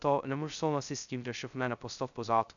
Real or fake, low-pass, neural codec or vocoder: fake; 7.2 kHz; codec, 16 kHz, 0.2 kbps, FocalCodec